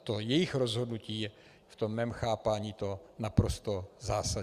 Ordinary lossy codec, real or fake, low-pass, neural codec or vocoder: Opus, 64 kbps; real; 14.4 kHz; none